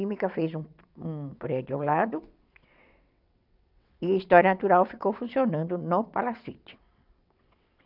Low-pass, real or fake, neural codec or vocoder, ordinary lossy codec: 5.4 kHz; fake; vocoder, 22.05 kHz, 80 mel bands, WaveNeXt; none